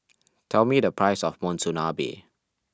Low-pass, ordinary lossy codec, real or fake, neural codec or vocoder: none; none; real; none